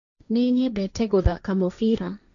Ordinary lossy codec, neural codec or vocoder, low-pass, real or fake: none; codec, 16 kHz, 1.1 kbps, Voila-Tokenizer; 7.2 kHz; fake